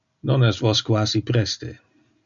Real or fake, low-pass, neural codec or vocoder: real; 7.2 kHz; none